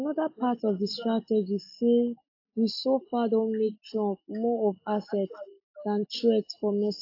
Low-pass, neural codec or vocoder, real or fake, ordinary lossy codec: 5.4 kHz; none; real; AAC, 32 kbps